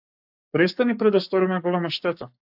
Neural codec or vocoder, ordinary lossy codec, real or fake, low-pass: codec, 44.1 kHz, 7.8 kbps, Pupu-Codec; AAC, 48 kbps; fake; 5.4 kHz